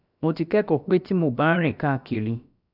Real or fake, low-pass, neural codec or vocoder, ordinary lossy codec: fake; 5.4 kHz; codec, 16 kHz, about 1 kbps, DyCAST, with the encoder's durations; none